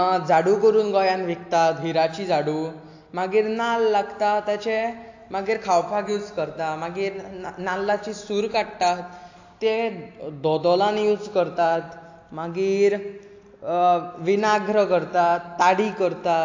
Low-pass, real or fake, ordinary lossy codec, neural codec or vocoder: 7.2 kHz; real; AAC, 48 kbps; none